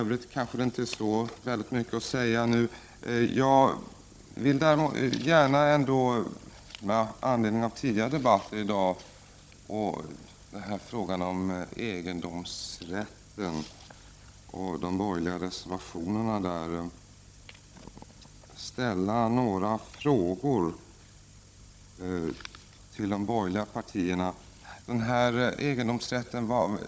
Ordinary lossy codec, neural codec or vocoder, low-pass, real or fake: none; codec, 16 kHz, 16 kbps, FunCodec, trained on Chinese and English, 50 frames a second; none; fake